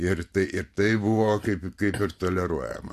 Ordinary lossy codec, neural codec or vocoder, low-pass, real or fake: MP3, 64 kbps; vocoder, 44.1 kHz, 128 mel bands every 512 samples, BigVGAN v2; 14.4 kHz; fake